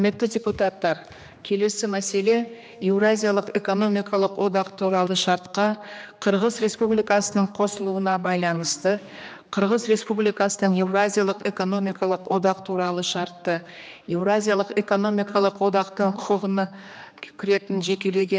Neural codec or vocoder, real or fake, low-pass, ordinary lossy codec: codec, 16 kHz, 2 kbps, X-Codec, HuBERT features, trained on general audio; fake; none; none